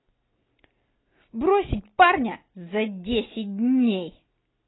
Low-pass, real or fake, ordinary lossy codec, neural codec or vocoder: 7.2 kHz; real; AAC, 16 kbps; none